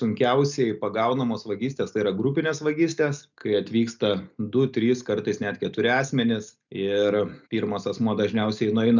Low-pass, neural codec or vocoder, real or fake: 7.2 kHz; none; real